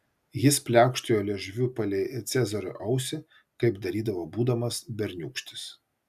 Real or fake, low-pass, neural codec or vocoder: real; 14.4 kHz; none